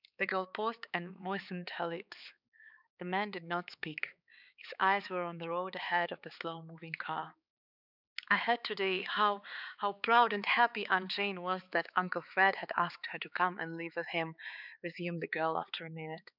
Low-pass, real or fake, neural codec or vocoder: 5.4 kHz; fake; codec, 16 kHz, 4 kbps, X-Codec, HuBERT features, trained on balanced general audio